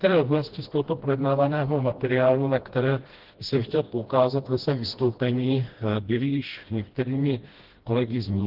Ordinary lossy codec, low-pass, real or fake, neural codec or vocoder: Opus, 16 kbps; 5.4 kHz; fake; codec, 16 kHz, 1 kbps, FreqCodec, smaller model